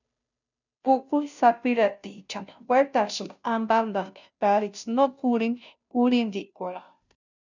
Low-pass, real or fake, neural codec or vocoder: 7.2 kHz; fake; codec, 16 kHz, 0.5 kbps, FunCodec, trained on Chinese and English, 25 frames a second